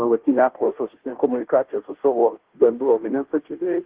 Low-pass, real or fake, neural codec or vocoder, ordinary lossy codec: 3.6 kHz; fake; codec, 16 kHz, 0.5 kbps, FunCodec, trained on Chinese and English, 25 frames a second; Opus, 16 kbps